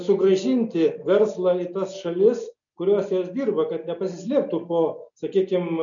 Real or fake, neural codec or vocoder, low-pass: real; none; 7.2 kHz